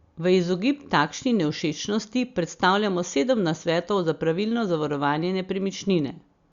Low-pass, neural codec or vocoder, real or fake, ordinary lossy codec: 7.2 kHz; none; real; Opus, 64 kbps